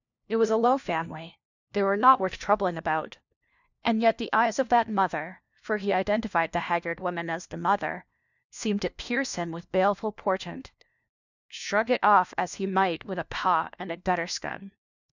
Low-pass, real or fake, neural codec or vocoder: 7.2 kHz; fake; codec, 16 kHz, 1 kbps, FunCodec, trained on LibriTTS, 50 frames a second